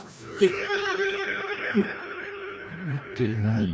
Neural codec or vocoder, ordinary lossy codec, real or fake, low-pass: codec, 16 kHz, 1 kbps, FreqCodec, larger model; none; fake; none